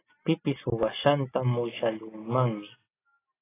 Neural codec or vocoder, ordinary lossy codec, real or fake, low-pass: none; AAC, 16 kbps; real; 3.6 kHz